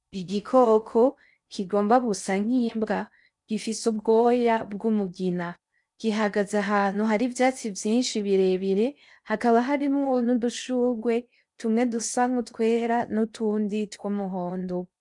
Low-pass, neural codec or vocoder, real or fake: 10.8 kHz; codec, 16 kHz in and 24 kHz out, 0.6 kbps, FocalCodec, streaming, 4096 codes; fake